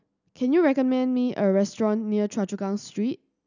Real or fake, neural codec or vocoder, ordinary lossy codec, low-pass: real; none; none; 7.2 kHz